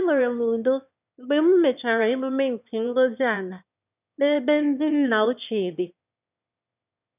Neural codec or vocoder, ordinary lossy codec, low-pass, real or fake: autoencoder, 22.05 kHz, a latent of 192 numbers a frame, VITS, trained on one speaker; none; 3.6 kHz; fake